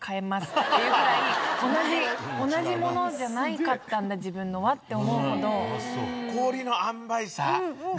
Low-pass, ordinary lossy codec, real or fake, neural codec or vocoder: none; none; real; none